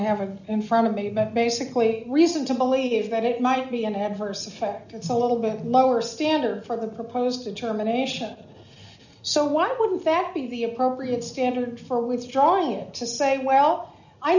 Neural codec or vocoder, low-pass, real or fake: none; 7.2 kHz; real